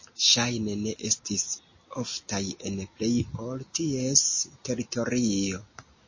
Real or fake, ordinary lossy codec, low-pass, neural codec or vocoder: real; MP3, 32 kbps; 7.2 kHz; none